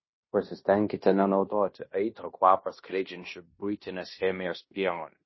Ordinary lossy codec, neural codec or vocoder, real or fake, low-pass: MP3, 24 kbps; codec, 16 kHz in and 24 kHz out, 0.9 kbps, LongCat-Audio-Codec, fine tuned four codebook decoder; fake; 7.2 kHz